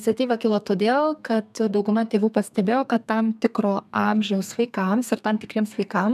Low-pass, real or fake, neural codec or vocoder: 14.4 kHz; fake; codec, 32 kHz, 1.9 kbps, SNAC